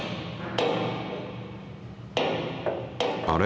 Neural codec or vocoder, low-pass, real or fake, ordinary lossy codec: none; none; real; none